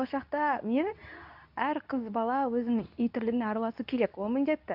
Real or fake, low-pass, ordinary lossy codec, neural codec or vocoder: fake; 5.4 kHz; AAC, 48 kbps; codec, 24 kHz, 0.9 kbps, WavTokenizer, medium speech release version 2